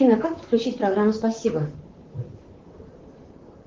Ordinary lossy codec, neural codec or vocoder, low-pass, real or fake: Opus, 16 kbps; vocoder, 44.1 kHz, 128 mel bands, Pupu-Vocoder; 7.2 kHz; fake